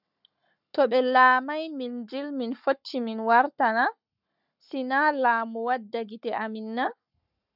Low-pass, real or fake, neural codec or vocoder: 5.4 kHz; fake; codec, 44.1 kHz, 7.8 kbps, Pupu-Codec